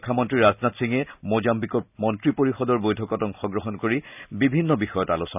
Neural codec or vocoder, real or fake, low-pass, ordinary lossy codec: none; real; 3.6 kHz; none